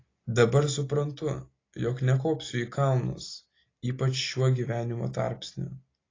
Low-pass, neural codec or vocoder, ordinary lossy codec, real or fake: 7.2 kHz; none; AAC, 32 kbps; real